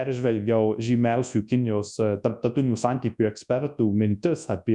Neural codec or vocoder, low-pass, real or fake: codec, 24 kHz, 0.9 kbps, WavTokenizer, large speech release; 10.8 kHz; fake